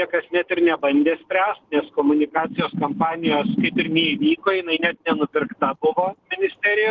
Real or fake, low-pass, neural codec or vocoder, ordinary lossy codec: real; 7.2 kHz; none; Opus, 16 kbps